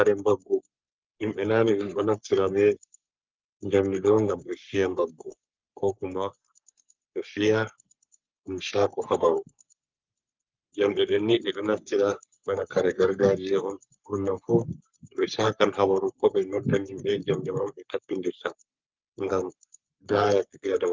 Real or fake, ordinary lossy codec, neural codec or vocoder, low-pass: fake; Opus, 24 kbps; codec, 44.1 kHz, 3.4 kbps, Pupu-Codec; 7.2 kHz